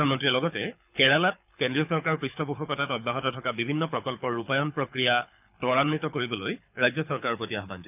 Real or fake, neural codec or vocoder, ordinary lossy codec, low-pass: fake; codec, 24 kHz, 6 kbps, HILCodec; none; 3.6 kHz